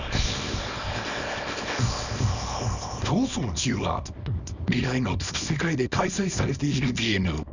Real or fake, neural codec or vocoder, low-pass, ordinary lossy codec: fake; codec, 24 kHz, 0.9 kbps, WavTokenizer, small release; 7.2 kHz; none